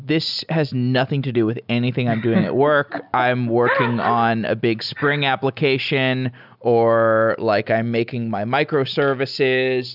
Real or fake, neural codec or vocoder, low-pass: real; none; 5.4 kHz